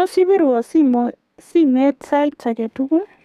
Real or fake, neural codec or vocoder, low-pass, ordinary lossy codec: fake; codec, 32 kHz, 1.9 kbps, SNAC; 14.4 kHz; none